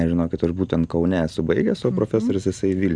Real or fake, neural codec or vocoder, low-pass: real; none; 9.9 kHz